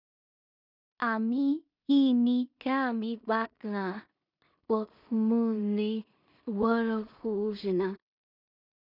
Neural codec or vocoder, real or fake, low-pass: codec, 16 kHz in and 24 kHz out, 0.4 kbps, LongCat-Audio-Codec, two codebook decoder; fake; 5.4 kHz